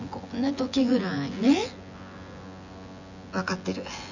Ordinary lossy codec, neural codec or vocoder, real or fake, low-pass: none; vocoder, 24 kHz, 100 mel bands, Vocos; fake; 7.2 kHz